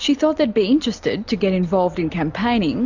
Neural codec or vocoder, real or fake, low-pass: none; real; 7.2 kHz